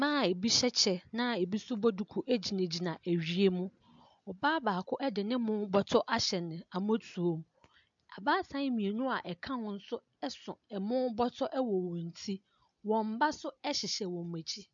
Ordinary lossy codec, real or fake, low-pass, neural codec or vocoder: MP3, 64 kbps; real; 7.2 kHz; none